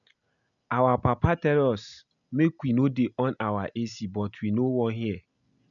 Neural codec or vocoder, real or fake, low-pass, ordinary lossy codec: none; real; 7.2 kHz; none